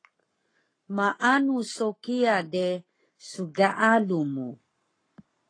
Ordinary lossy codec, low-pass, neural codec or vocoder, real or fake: AAC, 32 kbps; 9.9 kHz; codec, 44.1 kHz, 7.8 kbps, Pupu-Codec; fake